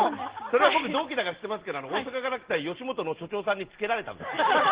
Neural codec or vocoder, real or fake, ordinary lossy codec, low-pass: none; real; Opus, 16 kbps; 3.6 kHz